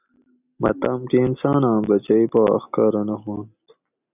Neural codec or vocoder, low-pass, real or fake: none; 3.6 kHz; real